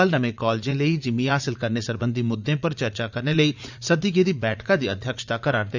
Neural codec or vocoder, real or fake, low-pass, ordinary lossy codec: vocoder, 44.1 kHz, 80 mel bands, Vocos; fake; 7.2 kHz; none